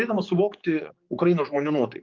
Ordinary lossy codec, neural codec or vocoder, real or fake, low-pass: Opus, 24 kbps; codec, 16 kHz, 4 kbps, X-Codec, HuBERT features, trained on general audio; fake; 7.2 kHz